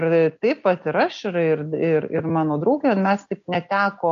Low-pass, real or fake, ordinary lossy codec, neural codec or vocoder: 7.2 kHz; real; MP3, 48 kbps; none